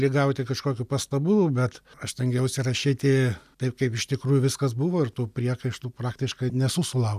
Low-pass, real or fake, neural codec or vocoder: 14.4 kHz; real; none